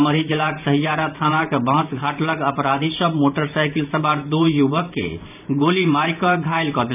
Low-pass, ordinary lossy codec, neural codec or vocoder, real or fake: 3.6 kHz; none; vocoder, 44.1 kHz, 128 mel bands every 512 samples, BigVGAN v2; fake